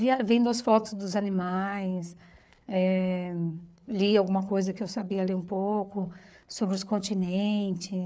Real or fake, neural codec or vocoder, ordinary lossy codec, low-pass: fake; codec, 16 kHz, 4 kbps, FreqCodec, larger model; none; none